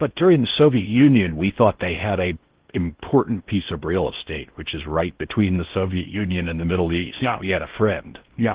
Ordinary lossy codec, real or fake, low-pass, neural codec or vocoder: Opus, 16 kbps; fake; 3.6 kHz; codec, 16 kHz in and 24 kHz out, 0.6 kbps, FocalCodec, streaming, 4096 codes